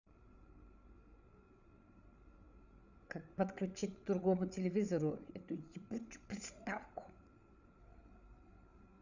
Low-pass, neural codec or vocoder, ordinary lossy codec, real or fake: 7.2 kHz; codec, 16 kHz, 8 kbps, FreqCodec, larger model; none; fake